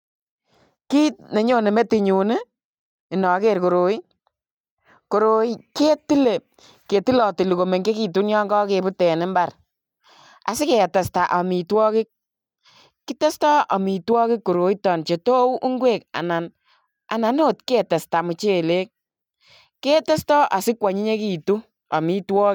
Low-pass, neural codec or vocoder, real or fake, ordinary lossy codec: 19.8 kHz; none; real; none